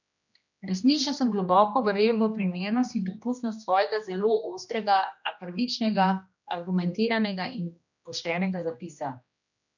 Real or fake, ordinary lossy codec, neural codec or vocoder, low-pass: fake; none; codec, 16 kHz, 1 kbps, X-Codec, HuBERT features, trained on general audio; 7.2 kHz